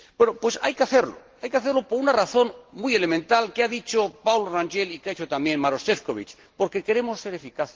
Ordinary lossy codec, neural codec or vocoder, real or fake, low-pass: Opus, 16 kbps; none; real; 7.2 kHz